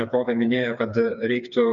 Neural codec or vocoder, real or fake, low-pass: codec, 16 kHz, 4 kbps, FreqCodec, smaller model; fake; 7.2 kHz